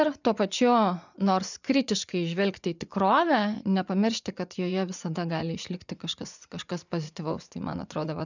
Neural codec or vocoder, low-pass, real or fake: vocoder, 24 kHz, 100 mel bands, Vocos; 7.2 kHz; fake